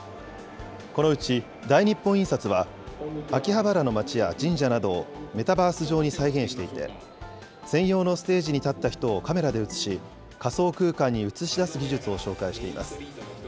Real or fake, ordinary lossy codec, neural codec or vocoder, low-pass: real; none; none; none